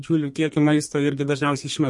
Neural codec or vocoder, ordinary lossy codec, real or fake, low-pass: codec, 32 kHz, 1.9 kbps, SNAC; MP3, 48 kbps; fake; 10.8 kHz